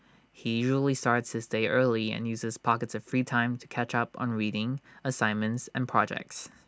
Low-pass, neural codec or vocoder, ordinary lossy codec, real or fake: none; none; none; real